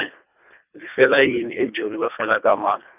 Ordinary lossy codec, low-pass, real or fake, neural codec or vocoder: none; 3.6 kHz; fake; codec, 24 kHz, 1.5 kbps, HILCodec